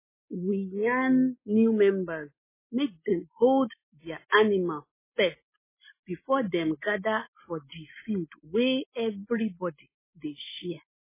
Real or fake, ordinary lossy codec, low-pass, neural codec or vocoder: real; MP3, 16 kbps; 3.6 kHz; none